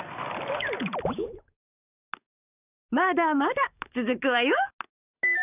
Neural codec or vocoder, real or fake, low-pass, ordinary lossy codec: codec, 44.1 kHz, 7.8 kbps, DAC; fake; 3.6 kHz; none